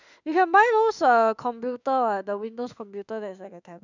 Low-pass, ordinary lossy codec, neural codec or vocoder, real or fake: 7.2 kHz; none; autoencoder, 48 kHz, 32 numbers a frame, DAC-VAE, trained on Japanese speech; fake